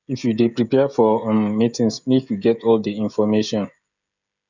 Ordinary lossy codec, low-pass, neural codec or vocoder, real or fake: none; 7.2 kHz; codec, 16 kHz, 16 kbps, FreqCodec, smaller model; fake